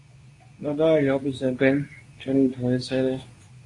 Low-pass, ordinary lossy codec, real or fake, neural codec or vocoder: 10.8 kHz; AAC, 48 kbps; fake; codec, 24 kHz, 0.9 kbps, WavTokenizer, medium speech release version 2